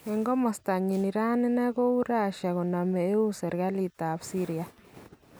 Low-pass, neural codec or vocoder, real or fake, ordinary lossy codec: none; none; real; none